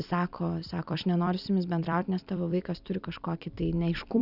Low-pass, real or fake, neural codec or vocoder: 5.4 kHz; fake; vocoder, 44.1 kHz, 128 mel bands every 256 samples, BigVGAN v2